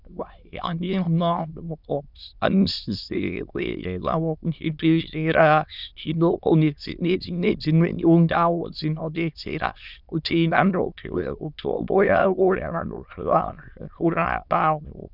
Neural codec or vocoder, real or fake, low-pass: autoencoder, 22.05 kHz, a latent of 192 numbers a frame, VITS, trained on many speakers; fake; 5.4 kHz